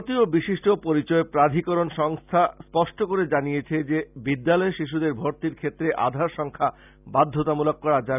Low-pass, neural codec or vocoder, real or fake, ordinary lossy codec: 3.6 kHz; none; real; none